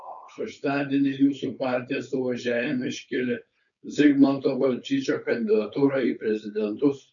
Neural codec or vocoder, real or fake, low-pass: codec, 16 kHz, 4.8 kbps, FACodec; fake; 7.2 kHz